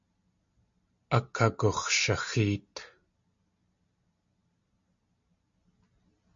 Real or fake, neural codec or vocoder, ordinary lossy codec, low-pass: real; none; MP3, 48 kbps; 7.2 kHz